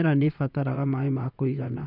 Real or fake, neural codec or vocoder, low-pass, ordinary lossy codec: fake; vocoder, 44.1 kHz, 128 mel bands, Pupu-Vocoder; 5.4 kHz; none